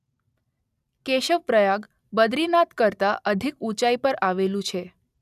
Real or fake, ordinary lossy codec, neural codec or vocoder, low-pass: real; none; none; 14.4 kHz